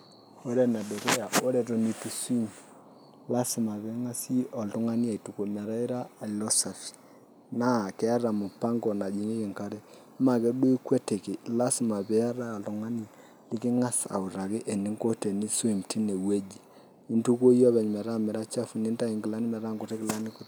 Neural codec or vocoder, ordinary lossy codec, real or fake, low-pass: none; none; real; none